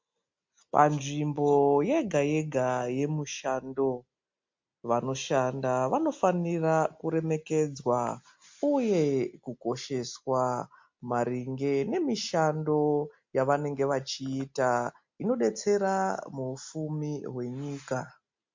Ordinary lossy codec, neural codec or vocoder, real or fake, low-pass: MP3, 48 kbps; none; real; 7.2 kHz